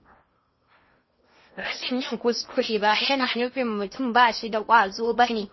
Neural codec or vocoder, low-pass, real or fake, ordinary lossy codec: codec, 16 kHz in and 24 kHz out, 0.8 kbps, FocalCodec, streaming, 65536 codes; 7.2 kHz; fake; MP3, 24 kbps